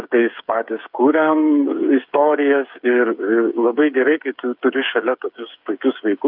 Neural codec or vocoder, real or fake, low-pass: codec, 16 kHz, 8 kbps, FreqCodec, smaller model; fake; 5.4 kHz